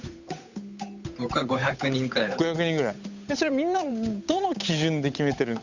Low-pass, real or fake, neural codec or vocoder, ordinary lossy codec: 7.2 kHz; fake; codec, 16 kHz, 8 kbps, FunCodec, trained on Chinese and English, 25 frames a second; none